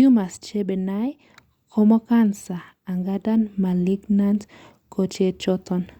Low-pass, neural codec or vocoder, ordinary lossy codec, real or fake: 19.8 kHz; none; Opus, 64 kbps; real